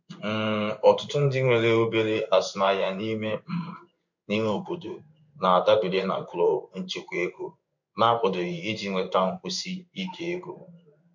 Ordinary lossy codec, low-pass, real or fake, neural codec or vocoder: MP3, 64 kbps; 7.2 kHz; fake; codec, 16 kHz in and 24 kHz out, 1 kbps, XY-Tokenizer